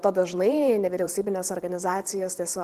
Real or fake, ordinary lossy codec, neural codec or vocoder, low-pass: fake; Opus, 16 kbps; vocoder, 44.1 kHz, 128 mel bands every 512 samples, BigVGAN v2; 14.4 kHz